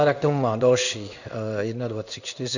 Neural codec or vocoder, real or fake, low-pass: codec, 16 kHz in and 24 kHz out, 1 kbps, XY-Tokenizer; fake; 7.2 kHz